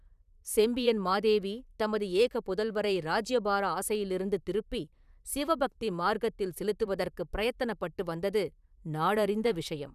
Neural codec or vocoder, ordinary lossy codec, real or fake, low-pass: vocoder, 44.1 kHz, 128 mel bands, Pupu-Vocoder; none; fake; 14.4 kHz